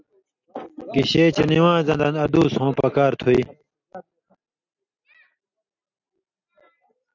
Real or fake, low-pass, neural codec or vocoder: real; 7.2 kHz; none